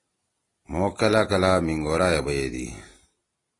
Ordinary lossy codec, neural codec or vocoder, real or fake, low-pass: AAC, 32 kbps; none; real; 10.8 kHz